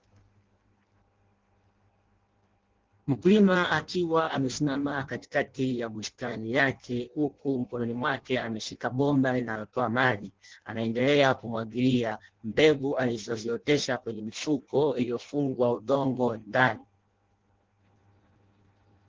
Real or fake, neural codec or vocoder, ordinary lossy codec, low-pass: fake; codec, 16 kHz in and 24 kHz out, 0.6 kbps, FireRedTTS-2 codec; Opus, 16 kbps; 7.2 kHz